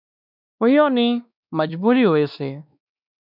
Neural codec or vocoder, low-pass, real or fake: codec, 16 kHz, 2 kbps, X-Codec, WavLM features, trained on Multilingual LibriSpeech; 5.4 kHz; fake